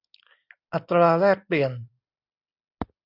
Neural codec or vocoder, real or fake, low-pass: none; real; 5.4 kHz